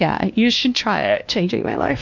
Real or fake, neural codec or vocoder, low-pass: fake; codec, 16 kHz, 1 kbps, X-Codec, WavLM features, trained on Multilingual LibriSpeech; 7.2 kHz